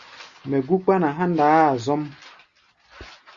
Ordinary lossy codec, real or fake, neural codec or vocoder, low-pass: Opus, 64 kbps; real; none; 7.2 kHz